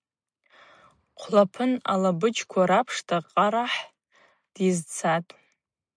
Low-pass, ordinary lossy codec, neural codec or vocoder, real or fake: 9.9 kHz; MP3, 96 kbps; none; real